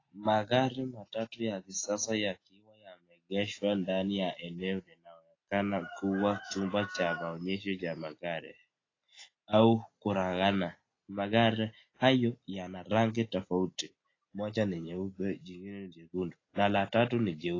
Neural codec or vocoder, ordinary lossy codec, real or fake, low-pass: none; AAC, 32 kbps; real; 7.2 kHz